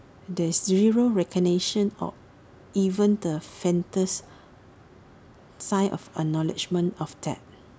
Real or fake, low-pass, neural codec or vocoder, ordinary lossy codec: real; none; none; none